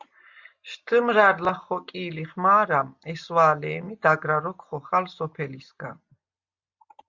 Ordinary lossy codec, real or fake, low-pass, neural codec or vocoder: Opus, 64 kbps; real; 7.2 kHz; none